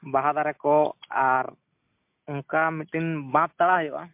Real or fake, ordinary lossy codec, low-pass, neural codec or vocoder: real; MP3, 32 kbps; 3.6 kHz; none